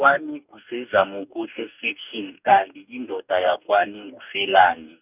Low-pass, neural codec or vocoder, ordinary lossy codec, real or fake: 3.6 kHz; codec, 44.1 kHz, 2.6 kbps, DAC; none; fake